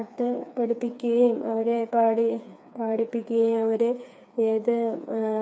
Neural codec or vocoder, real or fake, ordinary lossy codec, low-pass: codec, 16 kHz, 4 kbps, FreqCodec, smaller model; fake; none; none